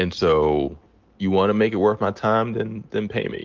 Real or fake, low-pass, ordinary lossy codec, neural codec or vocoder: real; 7.2 kHz; Opus, 32 kbps; none